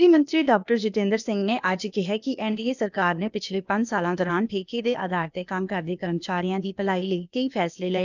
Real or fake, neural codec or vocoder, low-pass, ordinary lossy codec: fake; codec, 16 kHz, 0.8 kbps, ZipCodec; 7.2 kHz; none